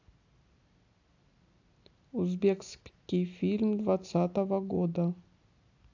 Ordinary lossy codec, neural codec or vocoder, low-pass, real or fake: AAC, 48 kbps; none; 7.2 kHz; real